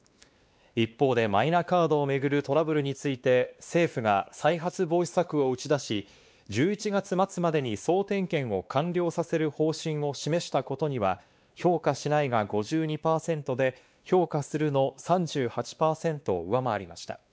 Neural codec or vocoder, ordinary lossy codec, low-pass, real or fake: codec, 16 kHz, 2 kbps, X-Codec, WavLM features, trained on Multilingual LibriSpeech; none; none; fake